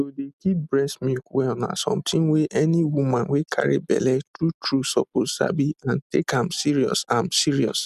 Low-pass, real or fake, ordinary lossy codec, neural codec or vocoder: 14.4 kHz; real; none; none